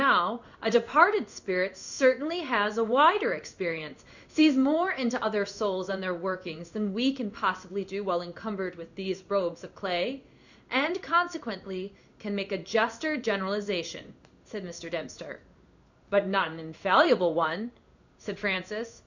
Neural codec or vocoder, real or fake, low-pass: codec, 16 kHz in and 24 kHz out, 1 kbps, XY-Tokenizer; fake; 7.2 kHz